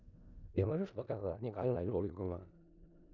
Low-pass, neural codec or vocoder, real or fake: 7.2 kHz; codec, 16 kHz in and 24 kHz out, 0.4 kbps, LongCat-Audio-Codec, four codebook decoder; fake